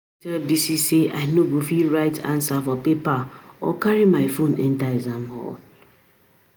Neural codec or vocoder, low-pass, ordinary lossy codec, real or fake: none; none; none; real